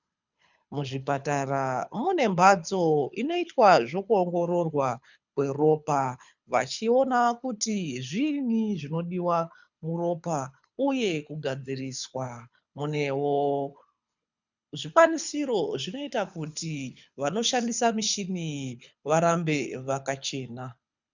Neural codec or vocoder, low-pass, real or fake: codec, 24 kHz, 6 kbps, HILCodec; 7.2 kHz; fake